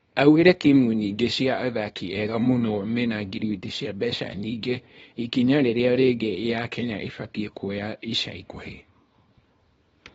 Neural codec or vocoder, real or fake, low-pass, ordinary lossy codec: codec, 24 kHz, 0.9 kbps, WavTokenizer, small release; fake; 10.8 kHz; AAC, 24 kbps